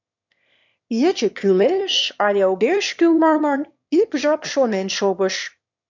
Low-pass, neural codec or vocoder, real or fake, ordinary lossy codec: 7.2 kHz; autoencoder, 22.05 kHz, a latent of 192 numbers a frame, VITS, trained on one speaker; fake; MP3, 64 kbps